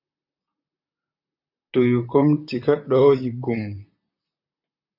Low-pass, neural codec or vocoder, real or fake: 5.4 kHz; vocoder, 44.1 kHz, 128 mel bands, Pupu-Vocoder; fake